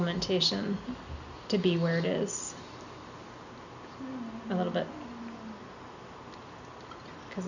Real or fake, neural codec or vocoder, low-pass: real; none; 7.2 kHz